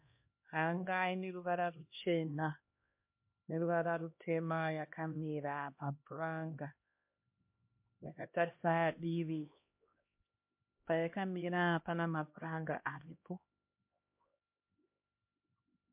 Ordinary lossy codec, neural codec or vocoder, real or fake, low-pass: MP3, 32 kbps; codec, 16 kHz, 1 kbps, X-Codec, HuBERT features, trained on LibriSpeech; fake; 3.6 kHz